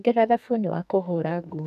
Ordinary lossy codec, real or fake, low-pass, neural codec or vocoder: none; fake; 14.4 kHz; codec, 44.1 kHz, 2.6 kbps, SNAC